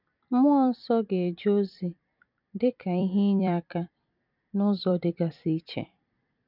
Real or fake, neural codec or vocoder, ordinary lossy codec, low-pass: fake; vocoder, 44.1 kHz, 128 mel bands every 256 samples, BigVGAN v2; none; 5.4 kHz